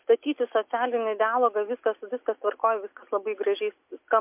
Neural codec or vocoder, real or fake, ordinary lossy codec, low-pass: none; real; MP3, 32 kbps; 3.6 kHz